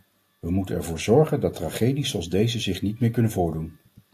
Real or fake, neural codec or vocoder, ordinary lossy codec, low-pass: real; none; AAC, 64 kbps; 14.4 kHz